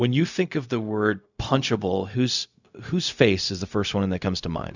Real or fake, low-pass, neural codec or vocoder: fake; 7.2 kHz; codec, 16 kHz, 0.4 kbps, LongCat-Audio-Codec